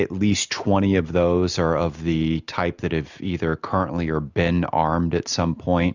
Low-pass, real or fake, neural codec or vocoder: 7.2 kHz; real; none